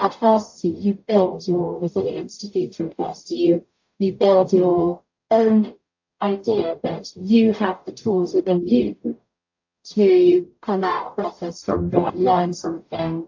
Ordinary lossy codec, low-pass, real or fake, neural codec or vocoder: AAC, 48 kbps; 7.2 kHz; fake; codec, 44.1 kHz, 0.9 kbps, DAC